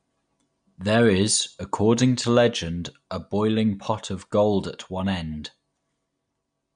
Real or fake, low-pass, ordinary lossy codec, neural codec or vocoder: real; 9.9 kHz; MP3, 64 kbps; none